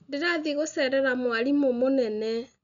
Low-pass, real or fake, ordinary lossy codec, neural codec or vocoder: 7.2 kHz; real; none; none